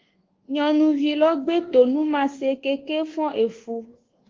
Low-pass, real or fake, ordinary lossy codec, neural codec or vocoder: 7.2 kHz; fake; Opus, 16 kbps; codec, 24 kHz, 1.2 kbps, DualCodec